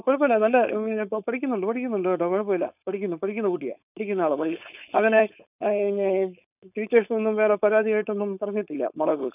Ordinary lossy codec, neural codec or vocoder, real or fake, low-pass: none; codec, 16 kHz, 4.8 kbps, FACodec; fake; 3.6 kHz